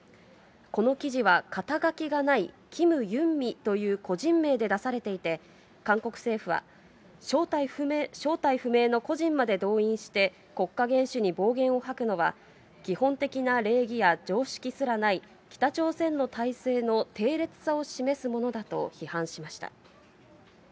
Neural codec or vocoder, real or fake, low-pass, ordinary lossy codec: none; real; none; none